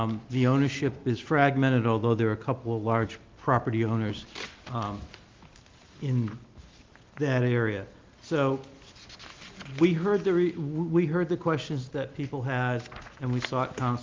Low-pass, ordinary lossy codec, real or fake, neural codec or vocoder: 7.2 kHz; Opus, 32 kbps; real; none